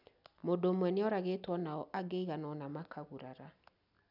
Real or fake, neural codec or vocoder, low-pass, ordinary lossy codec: real; none; 5.4 kHz; none